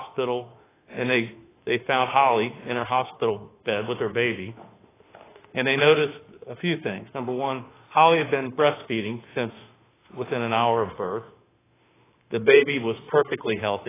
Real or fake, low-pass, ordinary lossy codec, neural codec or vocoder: fake; 3.6 kHz; AAC, 16 kbps; autoencoder, 48 kHz, 32 numbers a frame, DAC-VAE, trained on Japanese speech